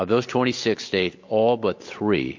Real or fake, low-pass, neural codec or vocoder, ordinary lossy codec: real; 7.2 kHz; none; MP3, 48 kbps